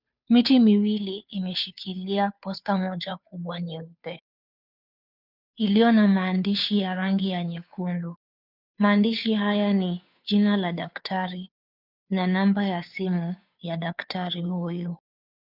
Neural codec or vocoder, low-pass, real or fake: codec, 16 kHz, 2 kbps, FunCodec, trained on Chinese and English, 25 frames a second; 5.4 kHz; fake